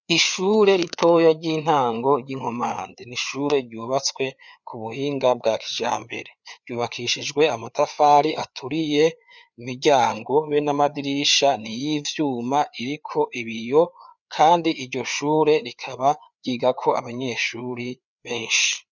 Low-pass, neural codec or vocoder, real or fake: 7.2 kHz; codec, 16 kHz, 4 kbps, FreqCodec, larger model; fake